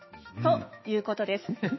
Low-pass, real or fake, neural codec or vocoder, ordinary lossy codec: 7.2 kHz; fake; autoencoder, 48 kHz, 128 numbers a frame, DAC-VAE, trained on Japanese speech; MP3, 24 kbps